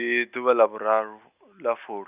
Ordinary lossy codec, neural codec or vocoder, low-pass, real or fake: Opus, 64 kbps; none; 3.6 kHz; real